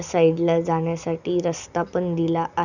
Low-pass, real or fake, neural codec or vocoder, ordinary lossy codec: 7.2 kHz; real; none; none